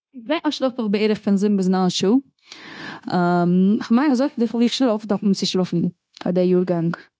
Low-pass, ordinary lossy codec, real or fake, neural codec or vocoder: none; none; fake; codec, 16 kHz, 0.9 kbps, LongCat-Audio-Codec